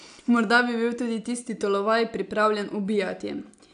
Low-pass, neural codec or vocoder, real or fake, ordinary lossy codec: 9.9 kHz; none; real; AAC, 96 kbps